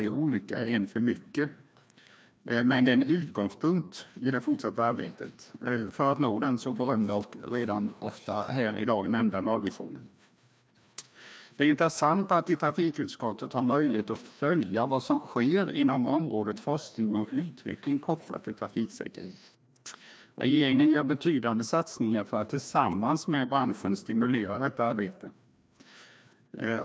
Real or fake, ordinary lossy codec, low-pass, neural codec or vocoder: fake; none; none; codec, 16 kHz, 1 kbps, FreqCodec, larger model